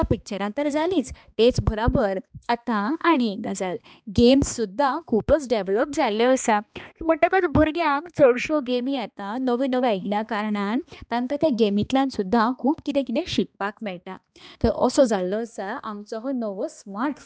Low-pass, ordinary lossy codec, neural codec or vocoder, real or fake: none; none; codec, 16 kHz, 2 kbps, X-Codec, HuBERT features, trained on balanced general audio; fake